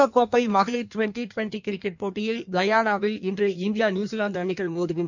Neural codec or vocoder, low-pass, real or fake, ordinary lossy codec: codec, 16 kHz in and 24 kHz out, 1.1 kbps, FireRedTTS-2 codec; 7.2 kHz; fake; none